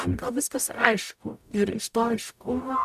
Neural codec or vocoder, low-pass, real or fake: codec, 44.1 kHz, 0.9 kbps, DAC; 14.4 kHz; fake